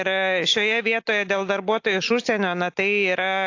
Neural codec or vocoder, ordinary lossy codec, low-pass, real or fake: none; AAC, 48 kbps; 7.2 kHz; real